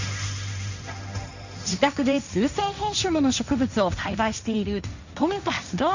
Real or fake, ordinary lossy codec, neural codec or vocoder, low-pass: fake; none; codec, 16 kHz, 1.1 kbps, Voila-Tokenizer; 7.2 kHz